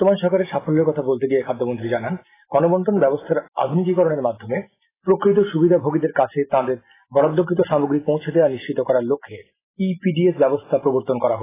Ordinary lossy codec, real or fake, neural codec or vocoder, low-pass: AAC, 16 kbps; real; none; 3.6 kHz